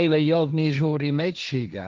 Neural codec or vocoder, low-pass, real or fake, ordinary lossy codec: codec, 16 kHz, 1.1 kbps, Voila-Tokenizer; 7.2 kHz; fake; Opus, 24 kbps